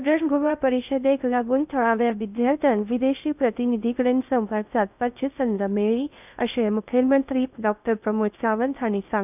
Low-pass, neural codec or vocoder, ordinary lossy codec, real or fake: 3.6 kHz; codec, 16 kHz in and 24 kHz out, 0.6 kbps, FocalCodec, streaming, 2048 codes; none; fake